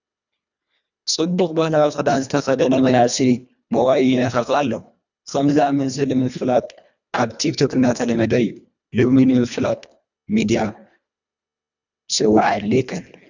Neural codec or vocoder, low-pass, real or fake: codec, 24 kHz, 1.5 kbps, HILCodec; 7.2 kHz; fake